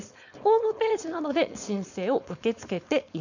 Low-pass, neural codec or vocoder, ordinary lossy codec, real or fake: 7.2 kHz; codec, 16 kHz, 4.8 kbps, FACodec; none; fake